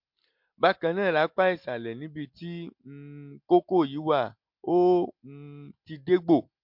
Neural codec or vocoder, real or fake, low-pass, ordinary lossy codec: none; real; 5.4 kHz; MP3, 48 kbps